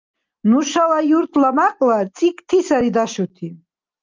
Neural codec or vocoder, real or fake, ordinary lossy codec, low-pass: none; real; Opus, 24 kbps; 7.2 kHz